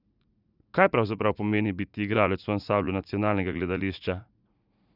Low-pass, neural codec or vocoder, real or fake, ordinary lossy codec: 5.4 kHz; vocoder, 44.1 kHz, 80 mel bands, Vocos; fake; none